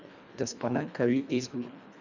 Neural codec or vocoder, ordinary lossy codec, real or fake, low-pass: codec, 24 kHz, 1.5 kbps, HILCodec; none; fake; 7.2 kHz